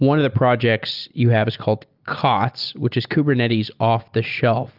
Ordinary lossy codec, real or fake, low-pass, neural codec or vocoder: Opus, 32 kbps; real; 5.4 kHz; none